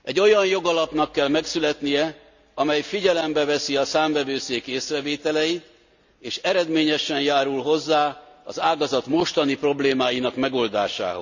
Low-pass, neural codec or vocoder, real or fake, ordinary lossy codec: 7.2 kHz; none; real; none